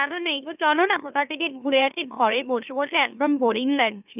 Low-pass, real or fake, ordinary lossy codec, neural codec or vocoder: 3.6 kHz; fake; none; autoencoder, 44.1 kHz, a latent of 192 numbers a frame, MeloTTS